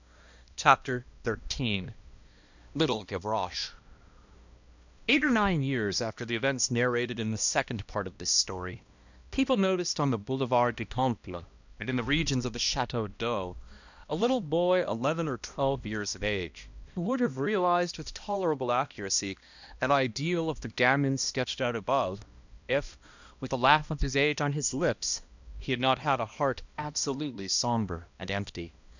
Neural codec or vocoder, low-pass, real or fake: codec, 16 kHz, 1 kbps, X-Codec, HuBERT features, trained on balanced general audio; 7.2 kHz; fake